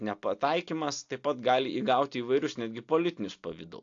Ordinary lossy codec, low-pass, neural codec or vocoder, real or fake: AAC, 48 kbps; 7.2 kHz; none; real